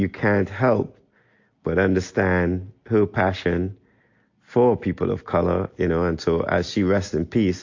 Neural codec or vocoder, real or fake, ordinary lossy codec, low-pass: none; real; AAC, 48 kbps; 7.2 kHz